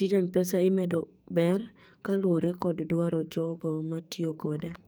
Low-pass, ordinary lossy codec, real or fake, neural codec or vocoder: none; none; fake; codec, 44.1 kHz, 2.6 kbps, SNAC